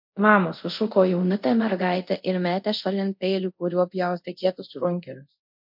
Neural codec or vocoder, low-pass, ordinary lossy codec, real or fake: codec, 24 kHz, 0.5 kbps, DualCodec; 5.4 kHz; MP3, 48 kbps; fake